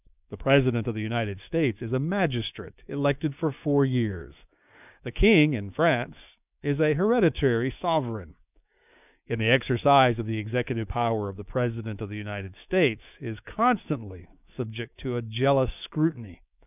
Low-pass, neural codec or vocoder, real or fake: 3.6 kHz; codec, 16 kHz, 6 kbps, DAC; fake